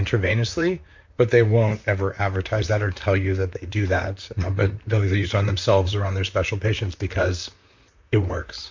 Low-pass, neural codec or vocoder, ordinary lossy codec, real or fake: 7.2 kHz; vocoder, 44.1 kHz, 128 mel bands, Pupu-Vocoder; MP3, 64 kbps; fake